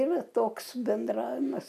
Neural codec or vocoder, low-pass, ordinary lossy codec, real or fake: none; 14.4 kHz; AAC, 96 kbps; real